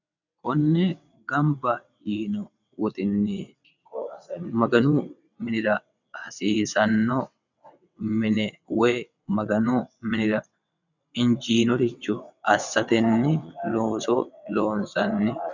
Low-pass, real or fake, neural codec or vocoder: 7.2 kHz; fake; vocoder, 22.05 kHz, 80 mel bands, WaveNeXt